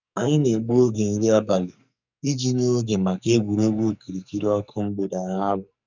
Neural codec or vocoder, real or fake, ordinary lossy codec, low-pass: codec, 44.1 kHz, 2.6 kbps, SNAC; fake; none; 7.2 kHz